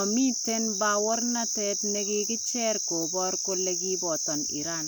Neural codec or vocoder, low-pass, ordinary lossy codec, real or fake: none; none; none; real